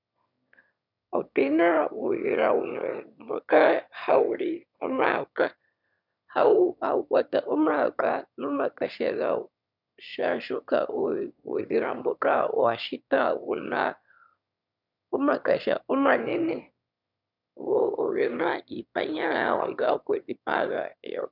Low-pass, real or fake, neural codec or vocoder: 5.4 kHz; fake; autoencoder, 22.05 kHz, a latent of 192 numbers a frame, VITS, trained on one speaker